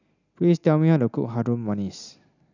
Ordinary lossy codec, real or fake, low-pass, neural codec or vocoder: none; real; 7.2 kHz; none